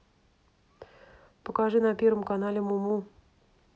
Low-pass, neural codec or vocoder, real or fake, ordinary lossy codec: none; none; real; none